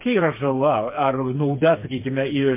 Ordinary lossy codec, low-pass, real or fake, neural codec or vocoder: MP3, 24 kbps; 3.6 kHz; fake; codec, 16 kHz, 4 kbps, FreqCodec, smaller model